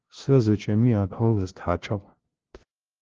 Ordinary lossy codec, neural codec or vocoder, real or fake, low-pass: Opus, 16 kbps; codec, 16 kHz, 0.5 kbps, FunCodec, trained on LibriTTS, 25 frames a second; fake; 7.2 kHz